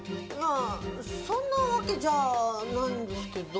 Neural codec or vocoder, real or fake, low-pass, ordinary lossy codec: none; real; none; none